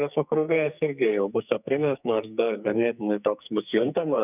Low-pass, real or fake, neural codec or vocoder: 3.6 kHz; fake; codec, 44.1 kHz, 2.6 kbps, SNAC